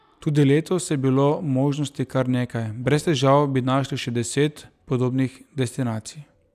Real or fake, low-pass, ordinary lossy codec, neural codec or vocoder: real; 14.4 kHz; none; none